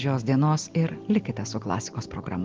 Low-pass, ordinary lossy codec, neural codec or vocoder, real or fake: 7.2 kHz; Opus, 16 kbps; none; real